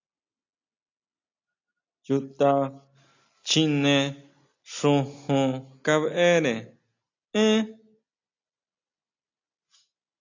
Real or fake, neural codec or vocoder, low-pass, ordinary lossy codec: real; none; 7.2 kHz; AAC, 48 kbps